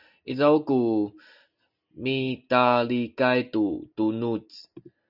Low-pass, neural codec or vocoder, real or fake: 5.4 kHz; none; real